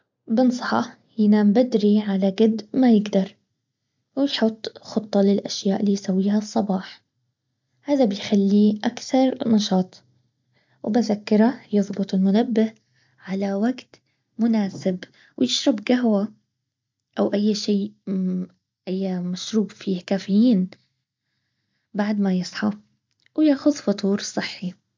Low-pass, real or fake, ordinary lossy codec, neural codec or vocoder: 7.2 kHz; real; MP3, 64 kbps; none